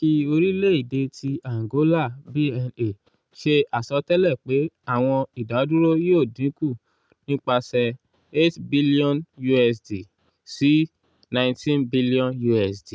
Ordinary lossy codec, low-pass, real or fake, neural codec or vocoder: none; none; real; none